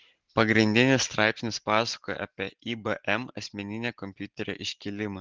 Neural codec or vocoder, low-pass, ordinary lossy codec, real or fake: none; 7.2 kHz; Opus, 16 kbps; real